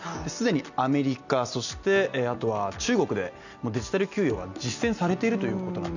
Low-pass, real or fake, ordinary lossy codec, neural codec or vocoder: 7.2 kHz; real; none; none